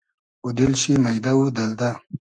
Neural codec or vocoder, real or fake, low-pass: codec, 44.1 kHz, 7.8 kbps, Pupu-Codec; fake; 9.9 kHz